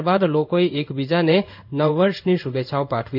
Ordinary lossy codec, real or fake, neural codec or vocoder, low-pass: none; fake; codec, 16 kHz in and 24 kHz out, 1 kbps, XY-Tokenizer; 5.4 kHz